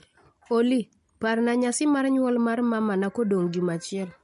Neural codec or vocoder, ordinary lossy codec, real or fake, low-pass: none; MP3, 48 kbps; real; 10.8 kHz